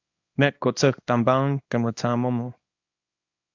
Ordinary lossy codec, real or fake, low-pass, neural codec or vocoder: AAC, 48 kbps; fake; 7.2 kHz; codec, 24 kHz, 0.9 kbps, WavTokenizer, small release